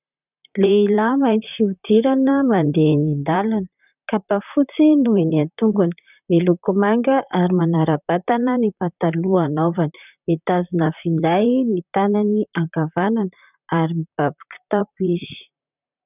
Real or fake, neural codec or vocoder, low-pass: fake; vocoder, 44.1 kHz, 128 mel bands, Pupu-Vocoder; 3.6 kHz